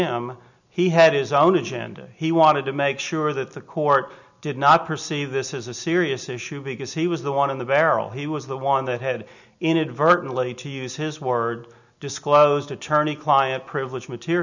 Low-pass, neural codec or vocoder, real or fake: 7.2 kHz; none; real